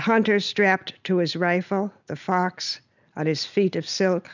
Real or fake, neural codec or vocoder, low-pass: real; none; 7.2 kHz